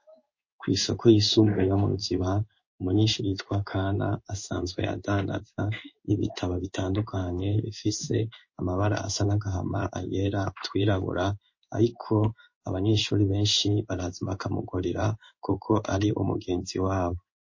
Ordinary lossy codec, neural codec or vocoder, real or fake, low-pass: MP3, 32 kbps; codec, 16 kHz in and 24 kHz out, 1 kbps, XY-Tokenizer; fake; 7.2 kHz